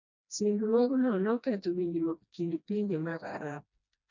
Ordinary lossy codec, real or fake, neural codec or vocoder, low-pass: none; fake; codec, 16 kHz, 1 kbps, FreqCodec, smaller model; 7.2 kHz